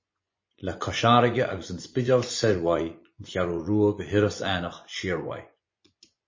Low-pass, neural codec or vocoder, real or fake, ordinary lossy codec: 7.2 kHz; vocoder, 24 kHz, 100 mel bands, Vocos; fake; MP3, 32 kbps